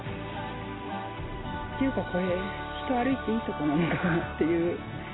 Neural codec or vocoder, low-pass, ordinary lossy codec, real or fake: none; 7.2 kHz; AAC, 16 kbps; real